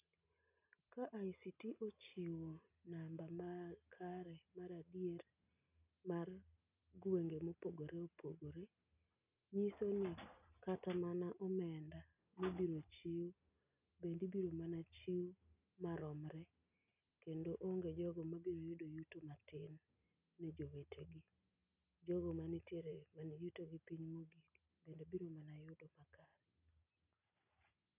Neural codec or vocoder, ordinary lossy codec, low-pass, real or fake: none; none; 3.6 kHz; real